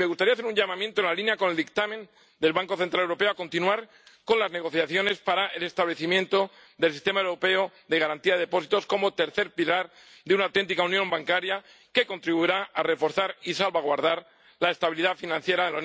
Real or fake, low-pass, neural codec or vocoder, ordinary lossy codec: real; none; none; none